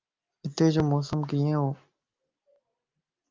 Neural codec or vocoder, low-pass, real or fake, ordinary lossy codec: none; 7.2 kHz; real; Opus, 32 kbps